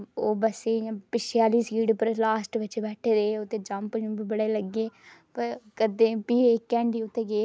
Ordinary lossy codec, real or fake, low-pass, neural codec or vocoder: none; real; none; none